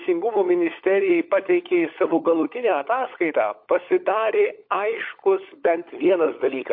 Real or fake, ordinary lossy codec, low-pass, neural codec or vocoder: fake; MP3, 32 kbps; 5.4 kHz; codec, 16 kHz, 16 kbps, FunCodec, trained on LibriTTS, 50 frames a second